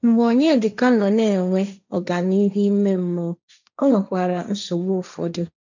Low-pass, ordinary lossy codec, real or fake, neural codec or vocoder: 7.2 kHz; none; fake; codec, 16 kHz, 1.1 kbps, Voila-Tokenizer